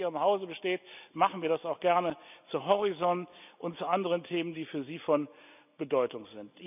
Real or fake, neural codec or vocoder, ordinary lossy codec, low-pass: real; none; none; 3.6 kHz